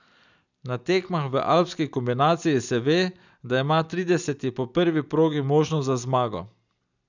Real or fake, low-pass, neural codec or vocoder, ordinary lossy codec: real; 7.2 kHz; none; none